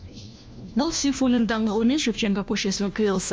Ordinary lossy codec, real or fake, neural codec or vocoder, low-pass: none; fake; codec, 16 kHz, 1 kbps, FunCodec, trained on LibriTTS, 50 frames a second; none